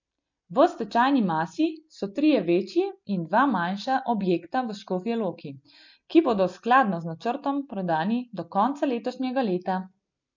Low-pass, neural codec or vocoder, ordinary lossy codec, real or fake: 7.2 kHz; none; MP3, 64 kbps; real